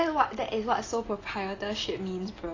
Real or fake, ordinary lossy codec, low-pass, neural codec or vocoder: real; AAC, 32 kbps; 7.2 kHz; none